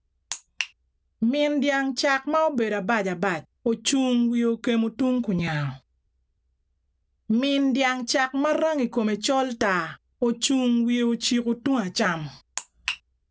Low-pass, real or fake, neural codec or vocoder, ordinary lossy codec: none; real; none; none